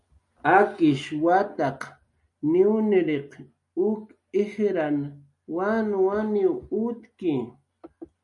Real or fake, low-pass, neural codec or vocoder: real; 10.8 kHz; none